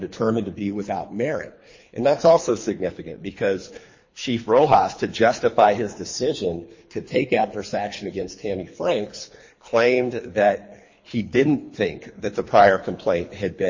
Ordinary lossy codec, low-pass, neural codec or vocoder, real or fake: MP3, 32 kbps; 7.2 kHz; codec, 24 kHz, 3 kbps, HILCodec; fake